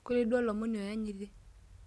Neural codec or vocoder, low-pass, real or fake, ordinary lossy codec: none; none; real; none